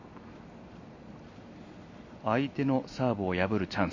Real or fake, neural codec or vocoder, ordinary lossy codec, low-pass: real; none; MP3, 32 kbps; 7.2 kHz